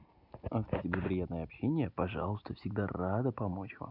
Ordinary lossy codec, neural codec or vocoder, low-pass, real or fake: none; none; 5.4 kHz; real